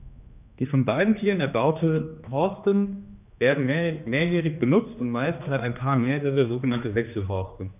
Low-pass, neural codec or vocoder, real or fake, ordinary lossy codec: 3.6 kHz; codec, 16 kHz, 2 kbps, X-Codec, HuBERT features, trained on general audio; fake; none